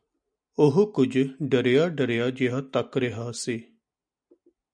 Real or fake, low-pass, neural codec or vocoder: real; 9.9 kHz; none